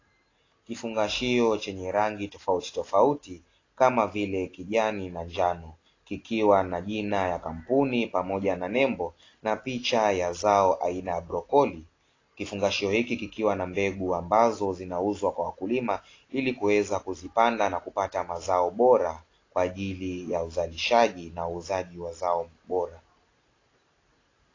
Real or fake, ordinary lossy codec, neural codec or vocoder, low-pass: real; AAC, 32 kbps; none; 7.2 kHz